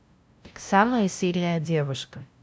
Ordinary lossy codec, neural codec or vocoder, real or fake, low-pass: none; codec, 16 kHz, 0.5 kbps, FunCodec, trained on LibriTTS, 25 frames a second; fake; none